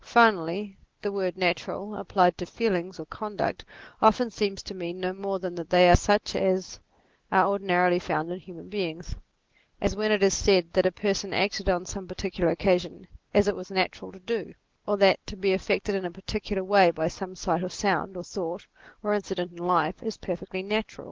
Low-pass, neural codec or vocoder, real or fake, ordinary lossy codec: 7.2 kHz; none; real; Opus, 16 kbps